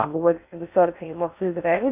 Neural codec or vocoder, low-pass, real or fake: codec, 16 kHz in and 24 kHz out, 0.6 kbps, FocalCodec, streaming, 2048 codes; 3.6 kHz; fake